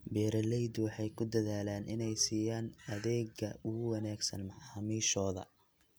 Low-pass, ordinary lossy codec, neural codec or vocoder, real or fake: none; none; none; real